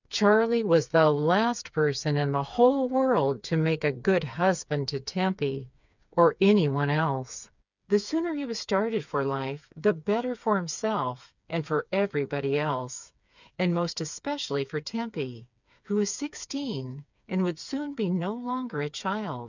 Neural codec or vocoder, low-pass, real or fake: codec, 16 kHz, 4 kbps, FreqCodec, smaller model; 7.2 kHz; fake